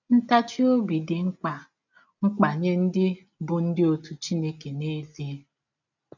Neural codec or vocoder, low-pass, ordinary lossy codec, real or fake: none; 7.2 kHz; none; real